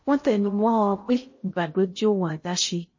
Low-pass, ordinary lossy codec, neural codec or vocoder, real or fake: 7.2 kHz; MP3, 32 kbps; codec, 16 kHz in and 24 kHz out, 0.6 kbps, FocalCodec, streaming, 2048 codes; fake